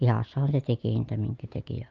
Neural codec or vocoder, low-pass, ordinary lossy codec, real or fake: none; 7.2 kHz; Opus, 24 kbps; real